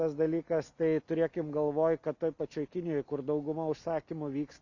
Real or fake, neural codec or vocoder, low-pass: real; none; 7.2 kHz